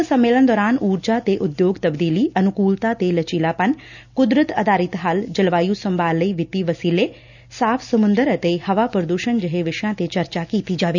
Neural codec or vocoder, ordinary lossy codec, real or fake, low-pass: none; none; real; 7.2 kHz